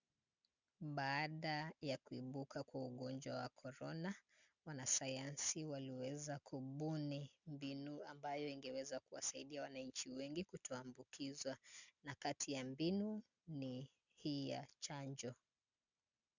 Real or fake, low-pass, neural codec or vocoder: real; 7.2 kHz; none